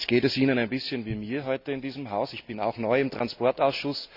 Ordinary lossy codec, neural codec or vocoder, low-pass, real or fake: none; none; 5.4 kHz; real